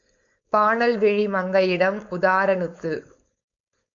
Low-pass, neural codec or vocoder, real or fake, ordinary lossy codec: 7.2 kHz; codec, 16 kHz, 4.8 kbps, FACodec; fake; MP3, 64 kbps